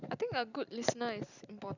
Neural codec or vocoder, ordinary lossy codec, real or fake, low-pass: vocoder, 44.1 kHz, 128 mel bands every 256 samples, BigVGAN v2; none; fake; 7.2 kHz